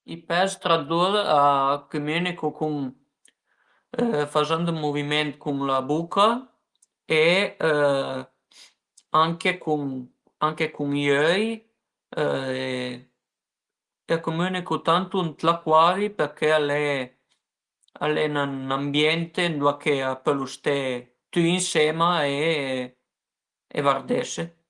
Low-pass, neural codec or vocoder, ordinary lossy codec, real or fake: 10.8 kHz; none; Opus, 24 kbps; real